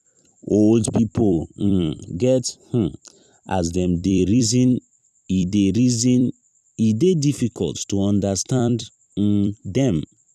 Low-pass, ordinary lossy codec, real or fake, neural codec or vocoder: 14.4 kHz; none; fake; vocoder, 44.1 kHz, 128 mel bands every 256 samples, BigVGAN v2